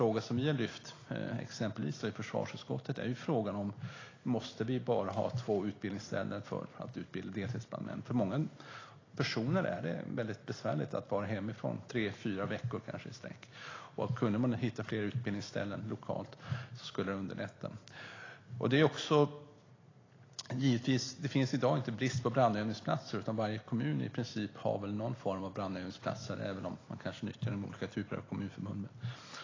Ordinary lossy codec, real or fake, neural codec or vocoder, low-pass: AAC, 32 kbps; real; none; 7.2 kHz